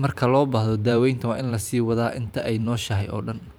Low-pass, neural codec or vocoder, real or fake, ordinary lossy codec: none; vocoder, 44.1 kHz, 128 mel bands every 512 samples, BigVGAN v2; fake; none